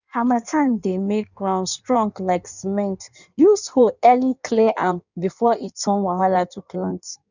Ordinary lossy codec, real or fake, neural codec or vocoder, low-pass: none; fake; codec, 16 kHz in and 24 kHz out, 1.1 kbps, FireRedTTS-2 codec; 7.2 kHz